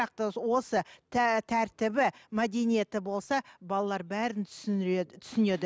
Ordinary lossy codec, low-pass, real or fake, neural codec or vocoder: none; none; real; none